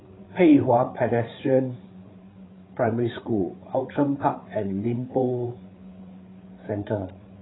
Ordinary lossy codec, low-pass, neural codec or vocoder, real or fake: AAC, 16 kbps; 7.2 kHz; codec, 16 kHz, 8 kbps, FreqCodec, larger model; fake